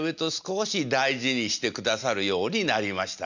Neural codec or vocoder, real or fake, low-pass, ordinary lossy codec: none; real; 7.2 kHz; none